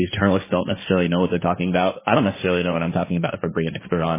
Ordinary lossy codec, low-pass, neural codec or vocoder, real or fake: MP3, 16 kbps; 3.6 kHz; codec, 16 kHz, 1.1 kbps, Voila-Tokenizer; fake